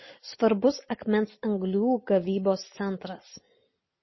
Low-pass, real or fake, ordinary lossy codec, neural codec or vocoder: 7.2 kHz; real; MP3, 24 kbps; none